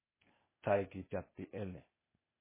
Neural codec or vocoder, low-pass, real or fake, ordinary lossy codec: codec, 16 kHz, 0.8 kbps, ZipCodec; 3.6 kHz; fake; MP3, 16 kbps